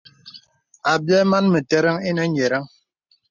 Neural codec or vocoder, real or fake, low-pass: none; real; 7.2 kHz